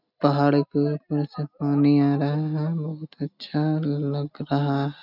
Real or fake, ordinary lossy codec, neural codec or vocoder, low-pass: real; none; none; 5.4 kHz